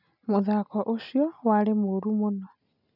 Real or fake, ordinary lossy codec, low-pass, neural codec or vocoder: real; none; 5.4 kHz; none